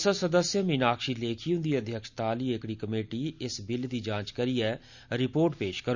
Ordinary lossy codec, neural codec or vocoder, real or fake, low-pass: none; none; real; 7.2 kHz